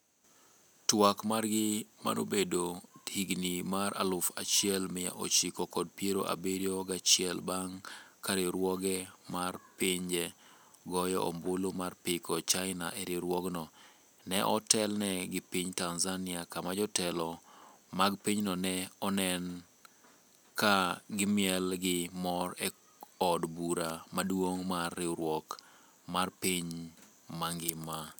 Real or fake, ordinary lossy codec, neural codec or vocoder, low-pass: real; none; none; none